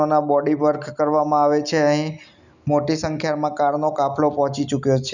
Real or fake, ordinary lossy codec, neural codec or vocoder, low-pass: real; none; none; 7.2 kHz